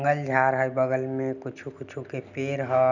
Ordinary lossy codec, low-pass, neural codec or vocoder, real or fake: none; 7.2 kHz; none; real